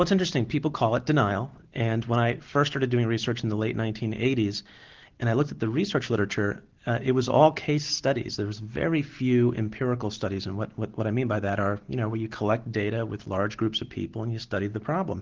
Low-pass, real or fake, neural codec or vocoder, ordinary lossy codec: 7.2 kHz; real; none; Opus, 16 kbps